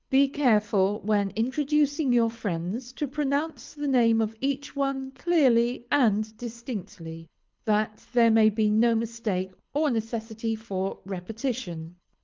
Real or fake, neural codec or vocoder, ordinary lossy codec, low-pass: fake; codec, 24 kHz, 6 kbps, HILCodec; Opus, 24 kbps; 7.2 kHz